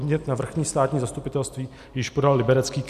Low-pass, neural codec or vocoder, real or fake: 14.4 kHz; none; real